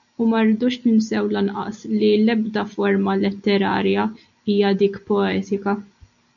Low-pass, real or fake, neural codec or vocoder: 7.2 kHz; real; none